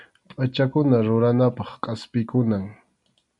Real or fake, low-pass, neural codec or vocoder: real; 10.8 kHz; none